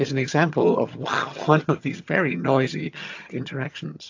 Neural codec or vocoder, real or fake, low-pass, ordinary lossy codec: vocoder, 22.05 kHz, 80 mel bands, HiFi-GAN; fake; 7.2 kHz; AAC, 48 kbps